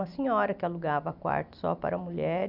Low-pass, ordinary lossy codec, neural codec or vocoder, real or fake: 5.4 kHz; none; none; real